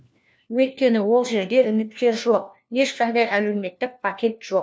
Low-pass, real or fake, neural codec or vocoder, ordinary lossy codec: none; fake; codec, 16 kHz, 1 kbps, FunCodec, trained on LibriTTS, 50 frames a second; none